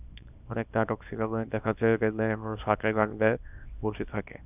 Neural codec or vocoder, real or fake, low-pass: codec, 24 kHz, 0.9 kbps, WavTokenizer, small release; fake; 3.6 kHz